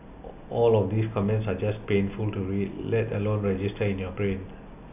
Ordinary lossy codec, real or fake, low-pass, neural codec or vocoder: none; real; 3.6 kHz; none